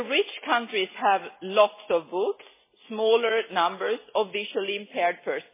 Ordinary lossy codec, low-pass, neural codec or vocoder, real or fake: MP3, 16 kbps; 3.6 kHz; none; real